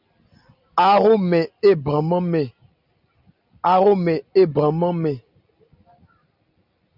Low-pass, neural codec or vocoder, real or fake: 5.4 kHz; none; real